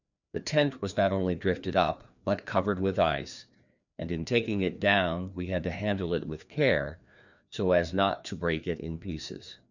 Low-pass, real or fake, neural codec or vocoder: 7.2 kHz; fake; codec, 16 kHz, 2 kbps, FreqCodec, larger model